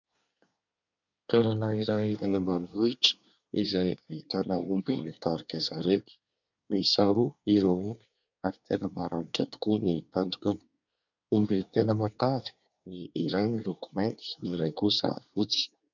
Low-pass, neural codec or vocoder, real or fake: 7.2 kHz; codec, 24 kHz, 1 kbps, SNAC; fake